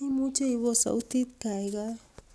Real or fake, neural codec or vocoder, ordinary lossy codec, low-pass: real; none; none; none